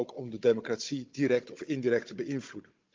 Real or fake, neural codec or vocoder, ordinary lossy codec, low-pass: fake; codec, 16 kHz, 16 kbps, FunCodec, trained on Chinese and English, 50 frames a second; Opus, 24 kbps; 7.2 kHz